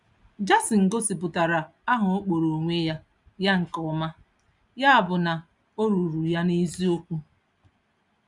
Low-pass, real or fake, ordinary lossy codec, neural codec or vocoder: 10.8 kHz; real; none; none